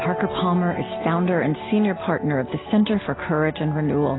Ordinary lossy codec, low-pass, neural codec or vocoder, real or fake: AAC, 16 kbps; 7.2 kHz; none; real